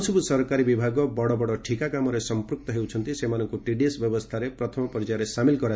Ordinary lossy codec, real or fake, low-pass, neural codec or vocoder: none; real; none; none